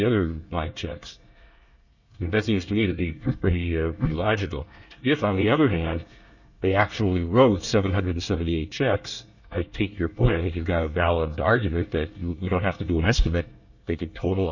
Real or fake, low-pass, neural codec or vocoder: fake; 7.2 kHz; codec, 24 kHz, 1 kbps, SNAC